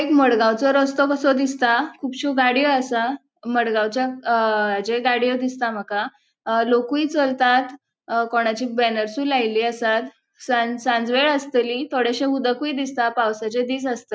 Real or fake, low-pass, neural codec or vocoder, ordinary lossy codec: real; none; none; none